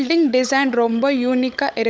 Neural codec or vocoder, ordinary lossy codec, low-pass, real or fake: codec, 16 kHz, 16 kbps, FunCodec, trained on LibriTTS, 50 frames a second; none; none; fake